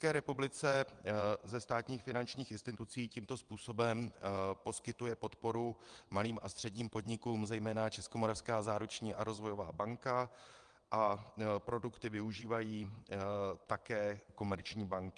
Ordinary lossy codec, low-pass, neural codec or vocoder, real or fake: Opus, 32 kbps; 9.9 kHz; vocoder, 22.05 kHz, 80 mel bands, WaveNeXt; fake